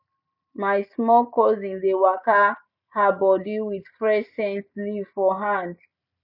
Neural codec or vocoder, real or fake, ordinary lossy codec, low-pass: none; real; none; 5.4 kHz